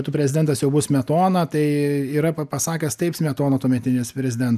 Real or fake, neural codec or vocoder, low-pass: real; none; 14.4 kHz